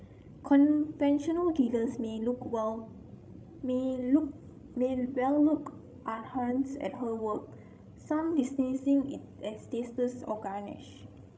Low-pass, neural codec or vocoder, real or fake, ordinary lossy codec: none; codec, 16 kHz, 16 kbps, FreqCodec, larger model; fake; none